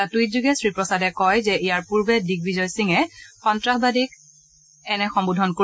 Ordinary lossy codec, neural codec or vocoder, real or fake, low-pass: none; none; real; none